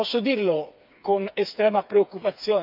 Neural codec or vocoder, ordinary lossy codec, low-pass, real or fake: codec, 16 kHz, 4 kbps, FreqCodec, smaller model; none; 5.4 kHz; fake